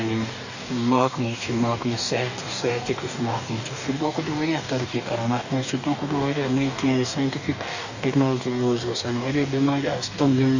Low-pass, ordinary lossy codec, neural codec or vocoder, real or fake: 7.2 kHz; none; codec, 44.1 kHz, 2.6 kbps, DAC; fake